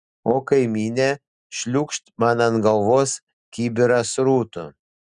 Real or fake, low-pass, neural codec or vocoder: real; 10.8 kHz; none